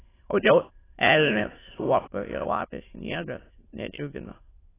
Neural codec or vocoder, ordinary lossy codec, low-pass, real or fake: autoencoder, 22.05 kHz, a latent of 192 numbers a frame, VITS, trained on many speakers; AAC, 16 kbps; 3.6 kHz; fake